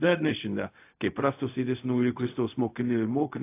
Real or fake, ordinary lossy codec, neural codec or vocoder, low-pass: fake; AAC, 24 kbps; codec, 16 kHz, 0.4 kbps, LongCat-Audio-Codec; 3.6 kHz